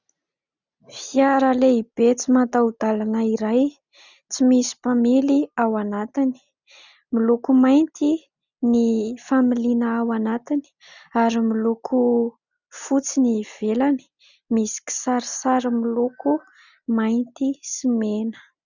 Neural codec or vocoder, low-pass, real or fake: none; 7.2 kHz; real